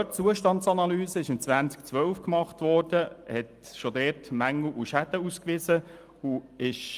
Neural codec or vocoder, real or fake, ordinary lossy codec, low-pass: none; real; Opus, 32 kbps; 14.4 kHz